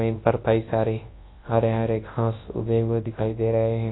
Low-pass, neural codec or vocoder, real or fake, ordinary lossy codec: 7.2 kHz; codec, 24 kHz, 0.9 kbps, WavTokenizer, large speech release; fake; AAC, 16 kbps